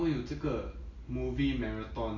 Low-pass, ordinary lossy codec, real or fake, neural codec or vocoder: 7.2 kHz; none; real; none